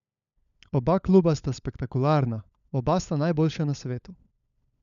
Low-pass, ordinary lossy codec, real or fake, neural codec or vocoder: 7.2 kHz; none; fake; codec, 16 kHz, 16 kbps, FunCodec, trained on LibriTTS, 50 frames a second